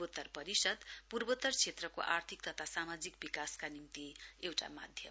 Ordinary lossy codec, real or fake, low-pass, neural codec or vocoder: none; real; none; none